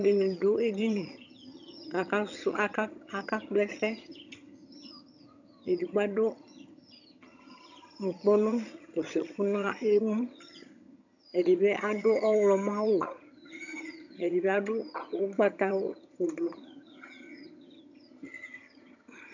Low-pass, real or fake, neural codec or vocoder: 7.2 kHz; fake; vocoder, 22.05 kHz, 80 mel bands, HiFi-GAN